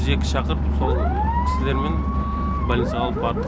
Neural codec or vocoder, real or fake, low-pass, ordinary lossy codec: none; real; none; none